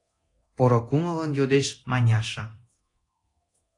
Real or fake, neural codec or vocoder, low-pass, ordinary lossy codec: fake; codec, 24 kHz, 0.9 kbps, DualCodec; 10.8 kHz; AAC, 48 kbps